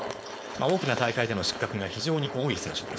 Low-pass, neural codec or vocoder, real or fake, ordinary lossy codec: none; codec, 16 kHz, 4.8 kbps, FACodec; fake; none